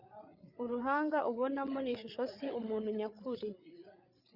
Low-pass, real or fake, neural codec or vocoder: 5.4 kHz; fake; codec, 16 kHz, 8 kbps, FreqCodec, larger model